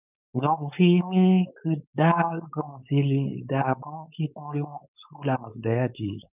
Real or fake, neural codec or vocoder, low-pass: fake; codec, 16 kHz, 4.8 kbps, FACodec; 3.6 kHz